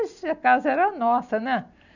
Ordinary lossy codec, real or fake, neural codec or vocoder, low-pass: none; real; none; 7.2 kHz